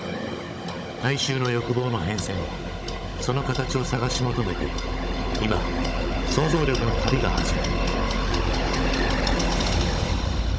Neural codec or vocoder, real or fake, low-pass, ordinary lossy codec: codec, 16 kHz, 16 kbps, FunCodec, trained on Chinese and English, 50 frames a second; fake; none; none